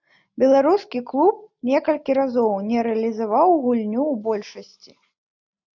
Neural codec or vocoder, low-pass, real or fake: none; 7.2 kHz; real